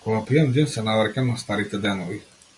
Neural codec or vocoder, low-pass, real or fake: none; 10.8 kHz; real